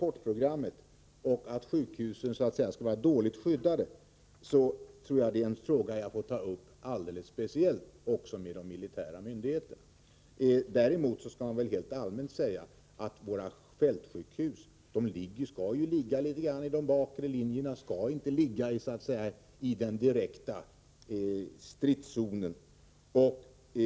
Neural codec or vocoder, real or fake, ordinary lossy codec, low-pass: none; real; none; none